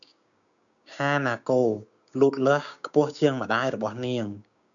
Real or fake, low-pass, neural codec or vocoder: fake; 7.2 kHz; codec, 16 kHz, 6 kbps, DAC